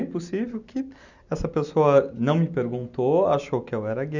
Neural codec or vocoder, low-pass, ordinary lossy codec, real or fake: none; 7.2 kHz; none; real